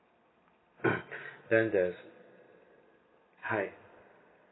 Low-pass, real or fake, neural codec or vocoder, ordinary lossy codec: 7.2 kHz; real; none; AAC, 16 kbps